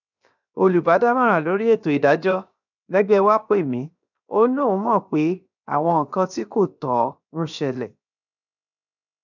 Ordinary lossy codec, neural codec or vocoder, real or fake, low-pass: none; codec, 16 kHz, 0.7 kbps, FocalCodec; fake; 7.2 kHz